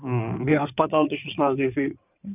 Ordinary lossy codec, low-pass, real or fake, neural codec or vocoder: none; 3.6 kHz; fake; codec, 16 kHz in and 24 kHz out, 2.2 kbps, FireRedTTS-2 codec